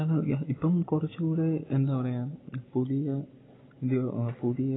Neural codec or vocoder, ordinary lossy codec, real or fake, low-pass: none; AAC, 16 kbps; real; 7.2 kHz